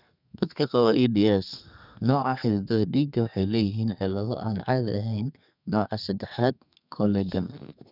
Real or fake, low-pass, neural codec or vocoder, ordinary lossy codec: fake; 5.4 kHz; codec, 32 kHz, 1.9 kbps, SNAC; none